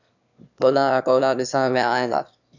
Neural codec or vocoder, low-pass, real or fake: autoencoder, 22.05 kHz, a latent of 192 numbers a frame, VITS, trained on one speaker; 7.2 kHz; fake